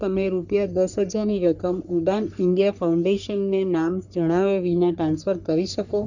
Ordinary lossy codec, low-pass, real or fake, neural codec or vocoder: none; 7.2 kHz; fake; codec, 44.1 kHz, 3.4 kbps, Pupu-Codec